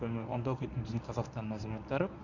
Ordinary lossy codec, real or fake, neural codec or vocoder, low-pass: none; fake; codec, 24 kHz, 0.9 kbps, WavTokenizer, medium speech release version 1; 7.2 kHz